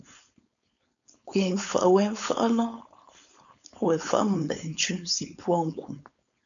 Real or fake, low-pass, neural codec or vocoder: fake; 7.2 kHz; codec, 16 kHz, 4.8 kbps, FACodec